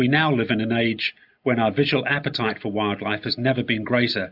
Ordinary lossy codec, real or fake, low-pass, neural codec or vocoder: AAC, 48 kbps; real; 5.4 kHz; none